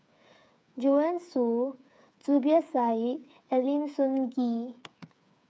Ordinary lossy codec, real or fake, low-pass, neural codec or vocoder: none; fake; none; codec, 16 kHz, 8 kbps, FreqCodec, smaller model